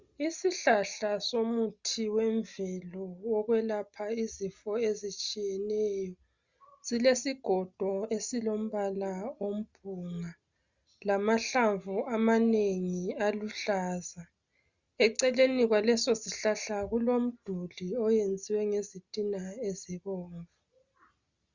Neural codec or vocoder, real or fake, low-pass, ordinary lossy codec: none; real; 7.2 kHz; Opus, 64 kbps